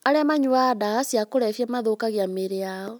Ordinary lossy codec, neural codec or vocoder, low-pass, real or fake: none; none; none; real